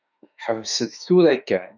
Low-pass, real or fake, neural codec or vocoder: 7.2 kHz; fake; autoencoder, 48 kHz, 32 numbers a frame, DAC-VAE, trained on Japanese speech